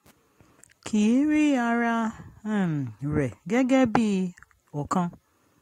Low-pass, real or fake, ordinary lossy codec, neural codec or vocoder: 19.8 kHz; real; AAC, 48 kbps; none